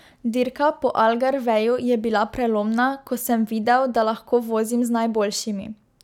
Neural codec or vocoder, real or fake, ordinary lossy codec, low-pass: none; real; none; 19.8 kHz